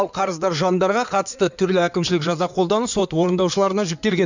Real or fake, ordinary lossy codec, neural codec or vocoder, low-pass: fake; none; codec, 16 kHz in and 24 kHz out, 2.2 kbps, FireRedTTS-2 codec; 7.2 kHz